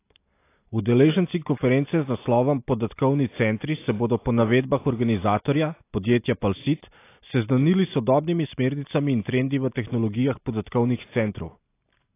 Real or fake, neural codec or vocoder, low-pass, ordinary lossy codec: real; none; 3.6 kHz; AAC, 24 kbps